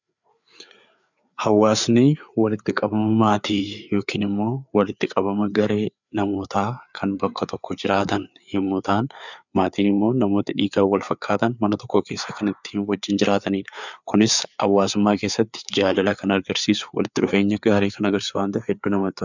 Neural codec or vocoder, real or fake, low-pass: codec, 16 kHz, 4 kbps, FreqCodec, larger model; fake; 7.2 kHz